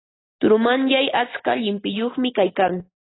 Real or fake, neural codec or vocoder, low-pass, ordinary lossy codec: real; none; 7.2 kHz; AAC, 16 kbps